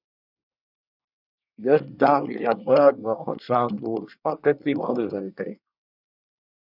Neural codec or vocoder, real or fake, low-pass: codec, 24 kHz, 1 kbps, SNAC; fake; 5.4 kHz